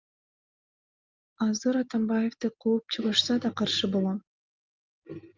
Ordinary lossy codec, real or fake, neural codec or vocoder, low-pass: Opus, 24 kbps; real; none; 7.2 kHz